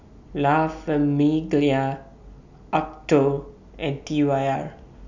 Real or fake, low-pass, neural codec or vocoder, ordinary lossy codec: real; 7.2 kHz; none; none